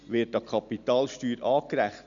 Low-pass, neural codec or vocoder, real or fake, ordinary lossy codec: 7.2 kHz; none; real; none